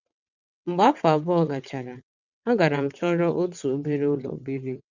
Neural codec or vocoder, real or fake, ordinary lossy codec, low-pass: vocoder, 22.05 kHz, 80 mel bands, WaveNeXt; fake; none; 7.2 kHz